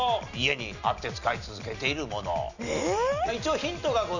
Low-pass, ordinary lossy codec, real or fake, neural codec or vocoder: 7.2 kHz; none; real; none